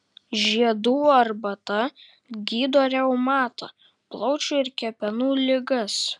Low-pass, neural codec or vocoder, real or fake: 10.8 kHz; none; real